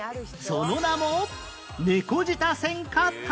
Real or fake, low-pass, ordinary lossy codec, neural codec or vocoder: real; none; none; none